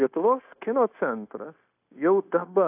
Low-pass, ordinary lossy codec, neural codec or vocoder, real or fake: 3.6 kHz; AAC, 32 kbps; codec, 16 kHz, 0.9 kbps, LongCat-Audio-Codec; fake